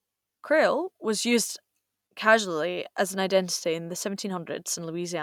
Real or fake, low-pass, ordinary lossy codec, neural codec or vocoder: real; 19.8 kHz; none; none